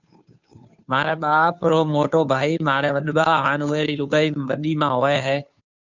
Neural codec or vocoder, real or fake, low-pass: codec, 16 kHz, 2 kbps, FunCodec, trained on Chinese and English, 25 frames a second; fake; 7.2 kHz